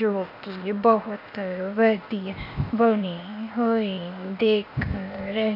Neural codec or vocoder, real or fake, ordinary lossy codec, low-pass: codec, 16 kHz, 0.8 kbps, ZipCodec; fake; none; 5.4 kHz